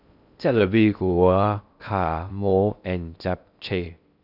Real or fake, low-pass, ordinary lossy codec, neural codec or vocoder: fake; 5.4 kHz; none; codec, 16 kHz in and 24 kHz out, 0.6 kbps, FocalCodec, streaming, 2048 codes